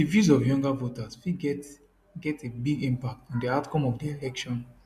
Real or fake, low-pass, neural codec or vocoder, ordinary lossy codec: real; 14.4 kHz; none; MP3, 96 kbps